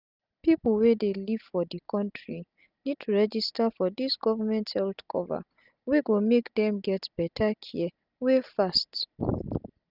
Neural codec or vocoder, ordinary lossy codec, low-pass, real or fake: none; none; 5.4 kHz; real